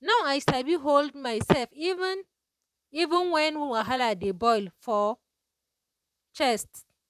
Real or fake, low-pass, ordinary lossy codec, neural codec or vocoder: fake; 14.4 kHz; none; vocoder, 44.1 kHz, 128 mel bands, Pupu-Vocoder